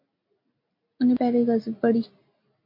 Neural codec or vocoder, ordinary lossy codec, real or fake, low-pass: none; MP3, 32 kbps; real; 5.4 kHz